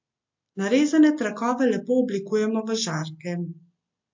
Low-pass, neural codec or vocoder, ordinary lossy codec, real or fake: 7.2 kHz; none; MP3, 48 kbps; real